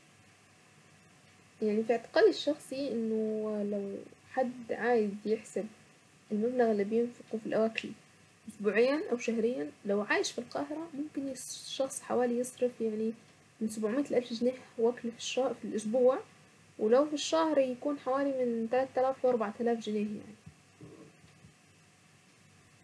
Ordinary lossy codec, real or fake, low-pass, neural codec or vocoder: none; real; none; none